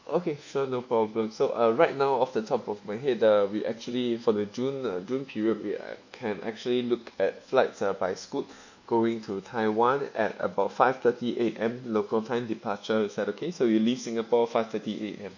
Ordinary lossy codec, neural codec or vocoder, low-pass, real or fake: MP3, 48 kbps; codec, 24 kHz, 1.2 kbps, DualCodec; 7.2 kHz; fake